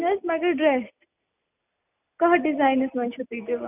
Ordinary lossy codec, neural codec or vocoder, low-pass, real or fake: none; none; 3.6 kHz; real